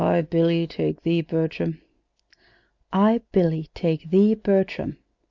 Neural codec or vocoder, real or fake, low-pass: none; real; 7.2 kHz